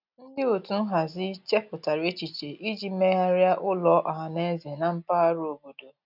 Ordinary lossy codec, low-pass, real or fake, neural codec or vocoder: none; 5.4 kHz; real; none